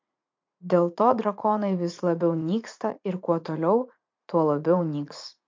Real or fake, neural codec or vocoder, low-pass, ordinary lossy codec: fake; vocoder, 44.1 kHz, 80 mel bands, Vocos; 7.2 kHz; MP3, 64 kbps